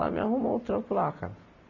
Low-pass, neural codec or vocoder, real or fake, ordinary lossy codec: 7.2 kHz; none; real; none